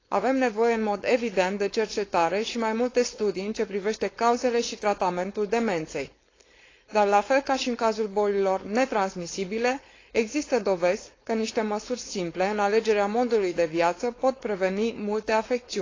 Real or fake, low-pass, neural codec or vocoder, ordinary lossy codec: fake; 7.2 kHz; codec, 16 kHz, 4.8 kbps, FACodec; AAC, 32 kbps